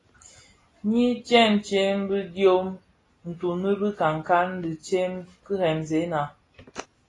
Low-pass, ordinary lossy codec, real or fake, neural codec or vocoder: 10.8 kHz; AAC, 32 kbps; real; none